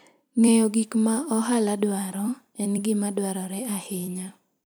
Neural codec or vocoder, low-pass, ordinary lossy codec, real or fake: vocoder, 44.1 kHz, 128 mel bands every 256 samples, BigVGAN v2; none; none; fake